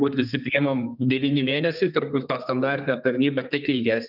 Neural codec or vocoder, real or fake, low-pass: codec, 32 kHz, 1.9 kbps, SNAC; fake; 5.4 kHz